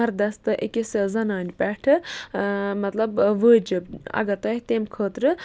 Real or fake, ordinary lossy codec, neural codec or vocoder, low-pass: real; none; none; none